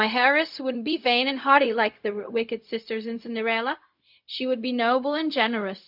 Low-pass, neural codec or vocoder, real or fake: 5.4 kHz; codec, 16 kHz, 0.4 kbps, LongCat-Audio-Codec; fake